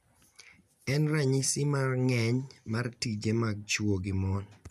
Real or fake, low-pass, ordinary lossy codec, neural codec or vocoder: real; 14.4 kHz; none; none